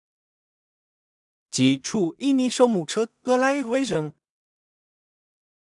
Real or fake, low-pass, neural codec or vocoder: fake; 10.8 kHz; codec, 16 kHz in and 24 kHz out, 0.4 kbps, LongCat-Audio-Codec, two codebook decoder